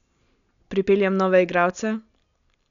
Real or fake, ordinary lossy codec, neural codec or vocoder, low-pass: real; none; none; 7.2 kHz